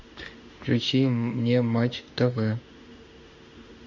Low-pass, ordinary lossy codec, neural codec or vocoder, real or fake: 7.2 kHz; MP3, 48 kbps; autoencoder, 48 kHz, 32 numbers a frame, DAC-VAE, trained on Japanese speech; fake